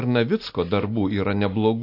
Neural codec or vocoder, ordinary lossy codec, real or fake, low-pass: none; AAC, 32 kbps; real; 5.4 kHz